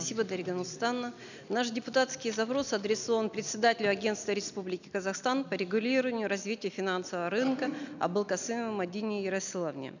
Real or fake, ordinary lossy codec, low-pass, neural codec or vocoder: real; none; 7.2 kHz; none